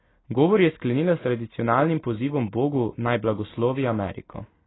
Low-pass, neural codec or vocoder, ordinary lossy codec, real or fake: 7.2 kHz; none; AAC, 16 kbps; real